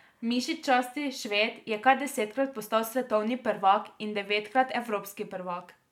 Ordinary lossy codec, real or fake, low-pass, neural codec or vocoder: MP3, 96 kbps; real; 19.8 kHz; none